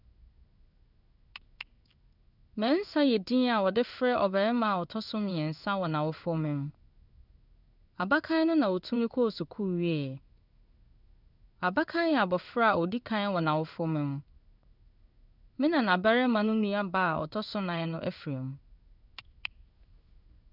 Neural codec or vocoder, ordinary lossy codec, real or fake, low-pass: codec, 16 kHz in and 24 kHz out, 1 kbps, XY-Tokenizer; none; fake; 5.4 kHz